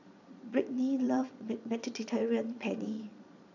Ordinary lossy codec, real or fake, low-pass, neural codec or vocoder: none; fake; 7.2 kHz; vocoder, 22.05 kHz, 80 mel bands, Vocos